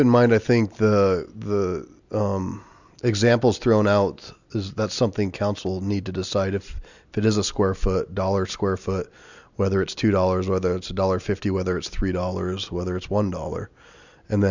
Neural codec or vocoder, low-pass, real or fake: none; 7.2 kHz; real